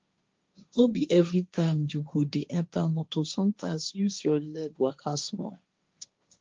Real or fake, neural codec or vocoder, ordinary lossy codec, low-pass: fake; codec, 16 kHz, 1.1 kbps, Voila-Tokenizer; Opus, 32 kbps; 7.2 kHz